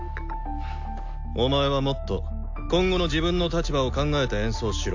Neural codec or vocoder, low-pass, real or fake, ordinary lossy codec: none; 7.2 kHz; real; AAC, 48 kbps